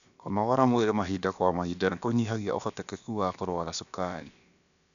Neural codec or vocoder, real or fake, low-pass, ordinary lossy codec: codec, 16 kHz, about 1 kbps, DyCAST, with the encoder's durations; fake; 7.2 kHz; none